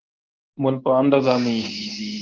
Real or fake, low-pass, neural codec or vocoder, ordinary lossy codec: fake; 7.2 kHz; codec, 24 kHz, 0.9 kbps, WavTokenizer, medium speech release version 1; Opus, 24 kbps